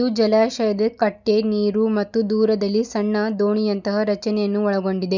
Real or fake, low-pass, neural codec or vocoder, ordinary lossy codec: real; 7.2 kHz; none; none